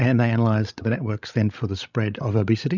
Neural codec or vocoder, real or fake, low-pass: codec, 16 kHz, 16 kbps, FreqCodec, larger model; fake; 7.2 kHz